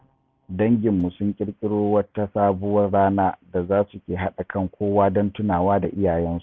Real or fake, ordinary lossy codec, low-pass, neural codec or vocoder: real; none; none; none